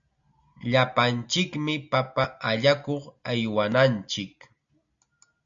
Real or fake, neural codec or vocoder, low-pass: real; none; 7.2 kHz